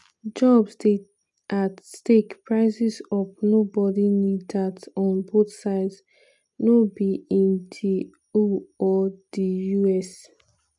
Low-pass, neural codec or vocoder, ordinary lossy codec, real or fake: 10.8 kHz; none; none; real